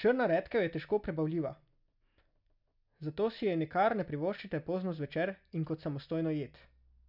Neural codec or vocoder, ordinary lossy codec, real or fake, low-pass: none; none; real; 5.4 kHz